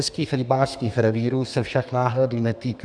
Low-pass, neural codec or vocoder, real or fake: 9.9 kHz; codec, 32 kHz, 1.9 kbps, SNAC; fake